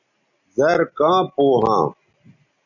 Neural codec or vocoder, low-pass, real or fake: none; 7.2 kHz; real